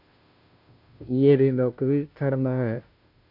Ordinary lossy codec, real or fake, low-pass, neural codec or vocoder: MP3, 48 kbps; fake; 5.4 kHz; codec, 16 kHz, 0.5 kbps, FunCodec, trained on Chinese and English, 25 frames a second